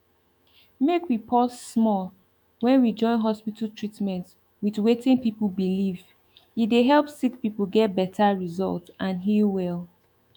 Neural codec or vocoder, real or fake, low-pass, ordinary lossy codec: autoencoder, 48 kHz, 128 numbers a frame, DAC-VAE, trained on Japanese speech; fake; 19.8 kHz; none